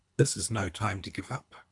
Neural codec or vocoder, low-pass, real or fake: codec, 24 kHz, 3 kbps, HILCodec; 10.8 kHz; fake